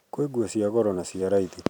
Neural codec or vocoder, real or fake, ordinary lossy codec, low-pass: vocoder, 44.1 kHz, 128 mel bands every 256 samples, BigVGAN v2; fake; none; 19.8 kHz